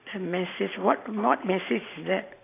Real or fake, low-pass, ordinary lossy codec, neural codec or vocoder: fake; 3.6 kHz; AAC, 24 kbps; vocoder, 44.1 kHz, 128 mel bands every 512 samples, BigVGAN v2